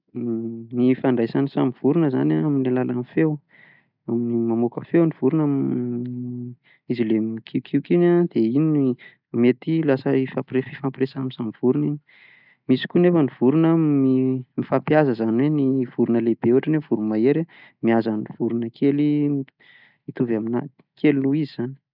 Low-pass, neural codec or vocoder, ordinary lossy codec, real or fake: 5.4 kHz; none; none; real